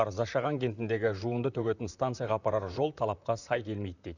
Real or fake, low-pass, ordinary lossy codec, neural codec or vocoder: fake; 7.2 kHz; none; vocoder, 44.1 kHz, 128 mel bands, Pupu-Vocoder